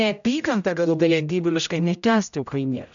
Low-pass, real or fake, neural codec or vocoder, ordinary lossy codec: 7.2 kHz; fake; codec, 16 kHz, 0.5 kbps, X-Codec, HuBERT features, trained on general audio; MP3, 96 kbps